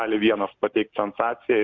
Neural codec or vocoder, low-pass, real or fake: none; 7.2 kHz; real